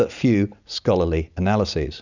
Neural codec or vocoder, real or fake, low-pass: none; real; 7.2 kHz